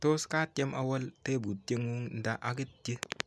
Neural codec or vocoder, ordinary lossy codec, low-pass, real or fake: none; none; none; real